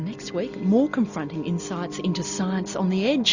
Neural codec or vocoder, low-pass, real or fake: none; 7.2 kHz; real